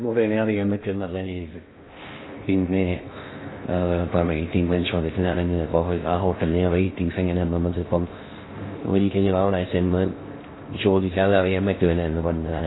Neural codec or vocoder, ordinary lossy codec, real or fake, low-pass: codec, 16 kHz in and 24 kHz out, 0.6 kbps, FocalCodec, streaming, 2048 codes; AAC, 16 kbps; fake; 7.2 kHz